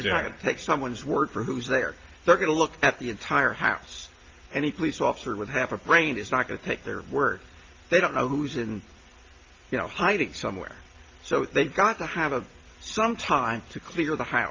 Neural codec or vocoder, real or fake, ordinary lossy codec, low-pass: none; real; Opus, 24 kbps; 7.2 kHz